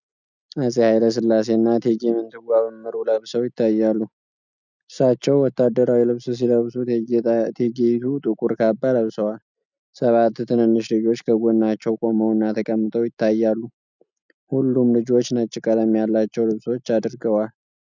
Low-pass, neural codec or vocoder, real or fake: 7.2 kHz; none; real